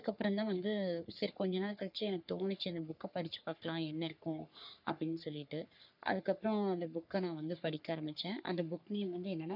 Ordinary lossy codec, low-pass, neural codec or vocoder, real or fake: none; 5.4 kHz; codec, 44.1 kHz, 3.4 kbps, Pupu-Codec; fake